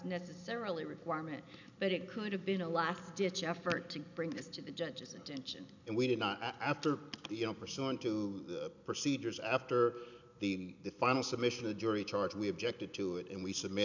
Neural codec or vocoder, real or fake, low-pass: none; real; 7.2 kHz